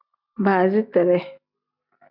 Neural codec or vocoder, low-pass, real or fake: none; 5.4 kHz; real